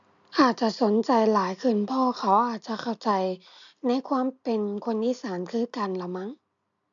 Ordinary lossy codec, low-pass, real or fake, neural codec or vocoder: MP3, 64 kbps; 7.2 kHz; real; none